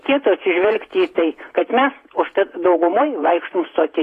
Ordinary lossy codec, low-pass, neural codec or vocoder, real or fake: AAC, 48 kbps; 14.4 kHz; vocoder, 48 kHz, 128 mel bands, Vocos; fake